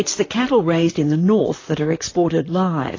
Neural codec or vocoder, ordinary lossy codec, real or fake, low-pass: vocoder, 44.1 kHz, 128 mel bands, Pupu-Vocoder; AAC, 32 kbps; fake; 7.2 kHz